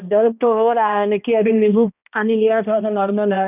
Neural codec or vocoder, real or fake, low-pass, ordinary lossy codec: codec, 16 kHz, 1 kbps, X-Codec, HuBERT features, trained on balanced general audio; fake; 3.6 kHz; none